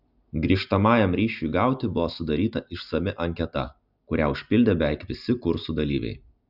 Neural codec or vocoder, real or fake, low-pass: none; real; 5.4 kHz